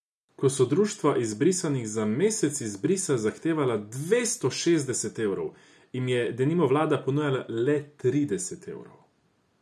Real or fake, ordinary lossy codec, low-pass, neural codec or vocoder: real; none; none; none